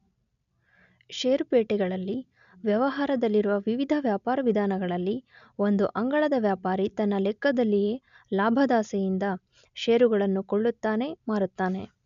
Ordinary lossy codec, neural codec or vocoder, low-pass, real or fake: none; none; 7.2 kHz; real